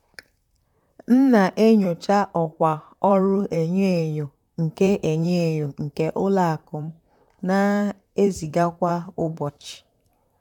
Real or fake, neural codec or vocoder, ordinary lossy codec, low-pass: fake; vocoder, 44.1 kHz, 128 mel bands, Pupu-Vocoder; none; 19.8 kHz